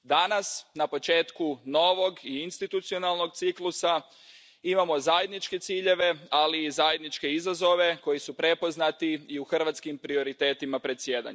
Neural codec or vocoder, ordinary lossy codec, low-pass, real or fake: none; none; none; real